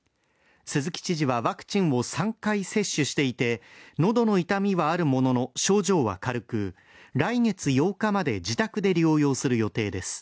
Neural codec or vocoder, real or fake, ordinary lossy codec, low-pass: none; real; none; none